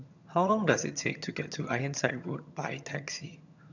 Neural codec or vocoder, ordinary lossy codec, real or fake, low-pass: vocoder, 22.05 kHz, 80 mel bands, HiFi-GAN; none; fake; 7.2 kHz